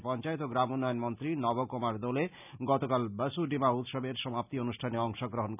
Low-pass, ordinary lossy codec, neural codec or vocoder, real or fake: 3.6 kHz; none; none; real